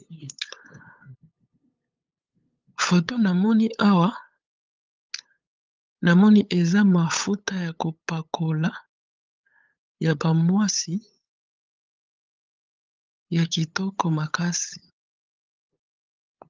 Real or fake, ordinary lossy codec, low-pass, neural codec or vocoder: fake; Opus, 32 kbps; 7.2 kHz; codec, 16 kHz, 8 kbps, FunCodec, trained on LibriTTS, 25 frames a second